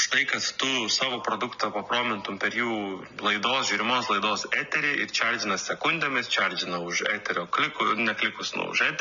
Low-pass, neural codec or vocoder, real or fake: 7.2 kHz; none; real